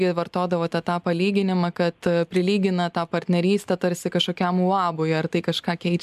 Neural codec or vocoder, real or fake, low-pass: none; real; 14.4 kHz